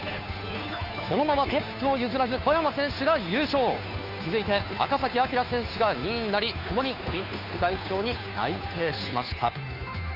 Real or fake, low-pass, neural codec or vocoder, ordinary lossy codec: fake; 5.4 kHz; codec, 16 kHz, 2 kbps, FunCodec, trained on Chinese and English, 25 frames a second; none